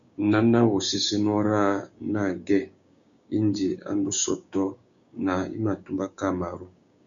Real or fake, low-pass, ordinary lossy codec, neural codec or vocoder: fake; 7.2 kHz; MP3, 96 kbps; codec, 16 kHz, 6 kbps, DAC